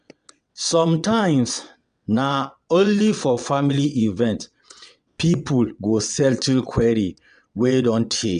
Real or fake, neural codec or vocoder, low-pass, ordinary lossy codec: fake; vocoder, 22.05 kHz, 80 mel bands, WaveNeXt; 9.9 kHz; none